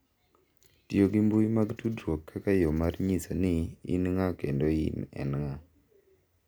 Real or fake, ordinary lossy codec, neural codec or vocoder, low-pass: real; none; none; none